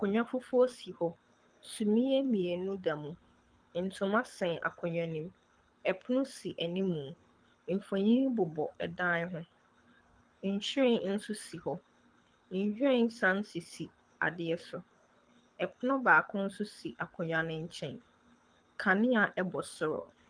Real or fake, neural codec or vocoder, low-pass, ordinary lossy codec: fake; codec, 24 kHz, 6 kbps, HILCodec; 9.9 kHz; Opus, 32 kbps